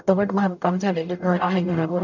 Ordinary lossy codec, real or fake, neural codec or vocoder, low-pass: none; fake; codec, 44.1 kHz, 0.9 kbps, DAC; 7.2 kHz